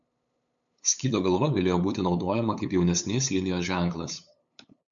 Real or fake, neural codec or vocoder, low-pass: fake; codec, 16 kHz, 8 kbps, FunCodec, trained on LibriTTS, 25 frames a second; 7.2 kHz